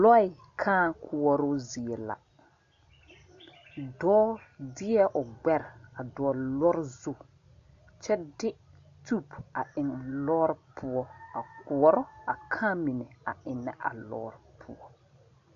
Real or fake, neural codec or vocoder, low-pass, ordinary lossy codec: real; none; 7.2 kHz; MP3, 64 kbps